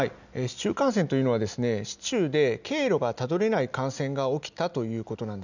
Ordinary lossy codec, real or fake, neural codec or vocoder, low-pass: none; real; none; 7.2 kHz